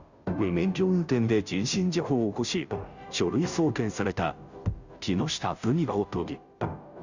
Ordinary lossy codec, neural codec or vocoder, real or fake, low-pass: none; codec, 16 kHz, 0.5 kbps, FunCodec, trained on Chinese and English, 25 frames a second; fake; 7.2 kHz